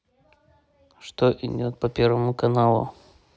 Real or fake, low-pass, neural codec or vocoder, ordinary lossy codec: real; none; none; none